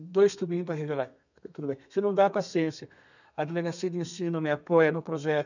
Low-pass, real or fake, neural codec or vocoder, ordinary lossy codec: 7.2 kHz; fake; codec, 32 kHz, 1.9 kbps, SNAC; none